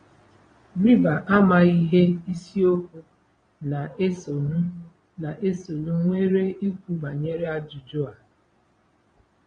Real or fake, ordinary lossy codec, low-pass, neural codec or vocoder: fake; AAC, 32 kbps; 9.9 kHz; vocoder, 22.05 kHz, 80 mel bands, Vocos